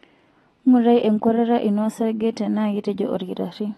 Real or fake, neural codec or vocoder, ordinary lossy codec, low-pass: real; none; AAC, 32 kbps; 19.8 kHz